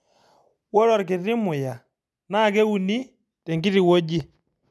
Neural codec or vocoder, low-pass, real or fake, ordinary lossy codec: none; none; real; none